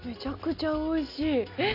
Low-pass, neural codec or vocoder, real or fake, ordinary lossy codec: 5.4 kHz; none; real; none